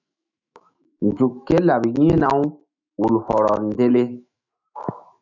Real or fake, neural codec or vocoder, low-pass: fake; autoencoder, 48 kHz, 128 numbers a frame, DAC-VAE, trained on Japanese speech; 7.2 kHz